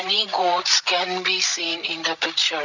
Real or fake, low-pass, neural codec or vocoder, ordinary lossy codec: fake; 7.2 kHz; codec, 16 kHz, 16 kbps, FreqCodec, larger model; none